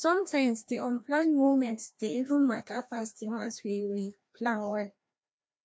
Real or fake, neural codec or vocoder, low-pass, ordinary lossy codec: fake; codec, 16 kHz, 1 kbps, FreqCodec, larger model; none; none